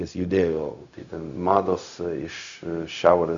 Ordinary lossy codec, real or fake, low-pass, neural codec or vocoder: Opus, 64 kbps; fake; 7.2 kHz; codec, 16 kHz, 0.4 kbps, LongCat-Audio-Codec